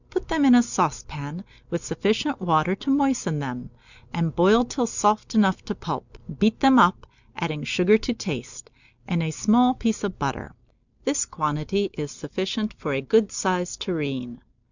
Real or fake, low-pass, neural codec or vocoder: real; 7.2 kHz; none